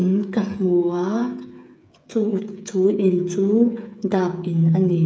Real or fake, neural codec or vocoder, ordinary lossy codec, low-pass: fake; codec, 16 kHz, 8 kbps, FreqCodec, smaller model; none; none